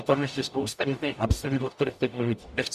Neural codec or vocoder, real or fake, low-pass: codec, 44.1 kHz, 0.9 kbps, DAC; fake; 14.4 kHz